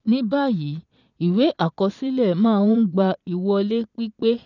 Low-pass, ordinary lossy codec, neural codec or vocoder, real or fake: 7.2 kHz; none; vocoder, 22.05 kHz, 80 mel bands, Vocos; fake